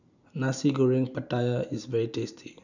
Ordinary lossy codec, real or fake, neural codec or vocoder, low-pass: none; real; none; 7.2 kHz